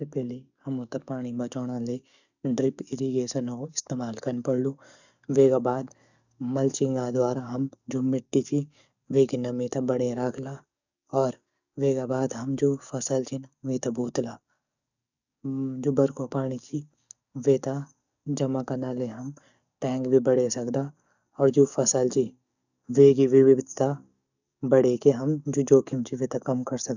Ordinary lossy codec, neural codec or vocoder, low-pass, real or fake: none; codec, 44.1 kHz, 7.8 kbps, DAC; 7.2 kHz; fake